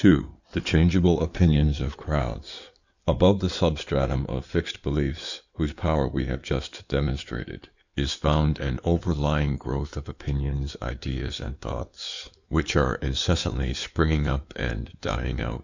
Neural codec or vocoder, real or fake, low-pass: codec, 16 kHz in and 24 kHz out, 2.2 kbps, FireRedTTS-2 codec; fake; 7.2 kHz